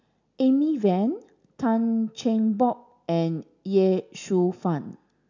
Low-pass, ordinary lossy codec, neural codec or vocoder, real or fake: 7.2 kHz; none; none; real